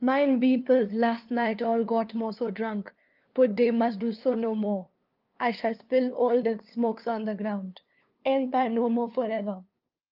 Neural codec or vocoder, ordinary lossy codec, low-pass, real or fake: codec, 16 kHz, 2 kbps, FunCodec, trained on LibriTTS, 25 frames a second; Opus, 32 kbps; 5.4 kHz; fake